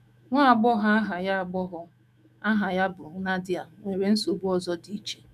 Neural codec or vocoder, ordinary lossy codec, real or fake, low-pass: autoencoder, 48 kHz, 128 numbers a frame, DAC-VAE, trained on Japanese speech; none; fake; 14.4 kHz